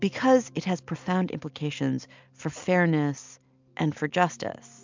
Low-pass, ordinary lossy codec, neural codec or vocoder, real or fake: 7.2 kHz; MP3, 64 kbps; none; real